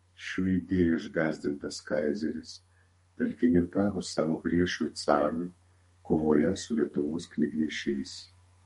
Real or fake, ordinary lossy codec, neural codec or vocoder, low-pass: fake; MP3, 48 kbps; codec, 32 kHz, 1.9 kbps, SNAC; 14.4 kHz